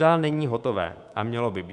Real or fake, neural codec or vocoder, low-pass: fake; codec, 24 kHz, 3.1 kbps, DualCodec; 10.8 kHz